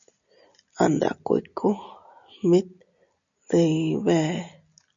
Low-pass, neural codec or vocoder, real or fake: 7.2 kHz; none; real